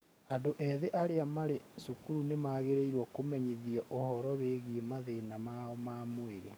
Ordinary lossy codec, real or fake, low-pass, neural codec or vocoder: none; fake; none; codec, 44.1 kHz, 7.8 kbps, DAC